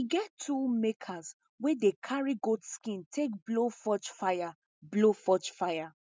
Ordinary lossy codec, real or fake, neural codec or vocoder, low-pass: none; real; none; none